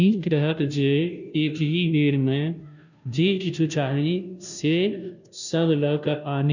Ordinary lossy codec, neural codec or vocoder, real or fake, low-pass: none; codec, 16 kHz, 0.5 kbps, FunCodec, trained on Chinese and English, 25 frames a second; fake; 7.2 kHz